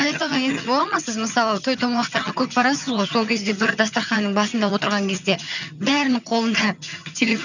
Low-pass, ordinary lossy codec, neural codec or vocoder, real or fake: 7.2 kHz; none; vocoder, 22.05 kHz, 80 mel bands, HiFi-GAN; fake